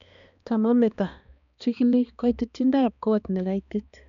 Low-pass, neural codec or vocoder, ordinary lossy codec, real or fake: 7.2 kHz; codec, 16 kHz, 2 kbps, X-Codec, HuBERT features, trained on balanced general audio; none; fake